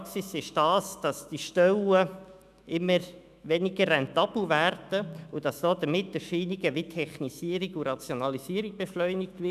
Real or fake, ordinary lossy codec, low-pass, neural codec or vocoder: fake; none; 14.4 kHz; autoencoder, 48 kHz, 128 numbers a frame, DAC-VAE, trained on Japanese speech